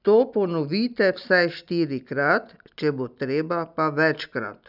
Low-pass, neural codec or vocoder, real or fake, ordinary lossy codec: 5.4 kHz; none; real; none